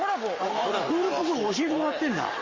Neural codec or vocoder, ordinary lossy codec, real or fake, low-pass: codec, 44.1 kHz, 7.8 kbps, Pupu-Codec; Opus, 32 kbps; fake; 7.2 kHz